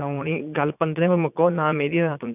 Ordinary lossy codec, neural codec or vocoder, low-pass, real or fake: none; vocoder, 22.05 kHz, 80 mel bands, Vocos; 3.6 kHz; fake